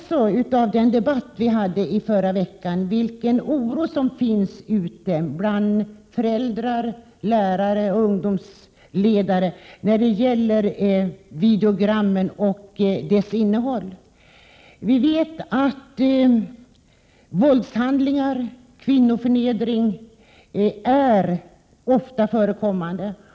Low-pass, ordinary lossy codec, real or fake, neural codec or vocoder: none; none; real; none